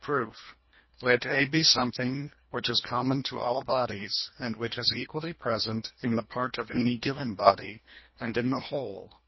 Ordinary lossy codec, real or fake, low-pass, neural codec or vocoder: MP3, 24 kbps; fake; 7.2 kHz; codec, 24 kHz, 1.5 kbps, HILCodec